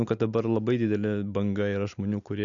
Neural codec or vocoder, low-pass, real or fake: none; 7.2 kHz; real